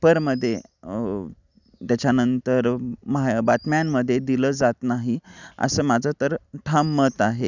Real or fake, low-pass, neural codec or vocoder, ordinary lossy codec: real; 7.2 kHz; none; none